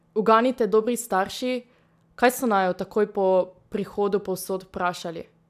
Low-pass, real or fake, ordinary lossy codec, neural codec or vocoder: 14.4 kHz; real; none; none